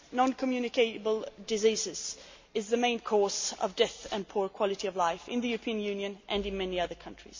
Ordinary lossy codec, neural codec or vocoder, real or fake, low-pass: MP3, 48 kbps; none; real; 7.2 kHz